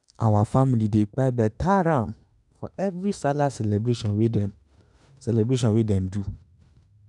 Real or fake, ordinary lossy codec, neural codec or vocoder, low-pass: fake; none; autoencoder, 48 kHz, 32 numbers a frame, DAC-VAE, trained on Japanese speech; 10.8 kHz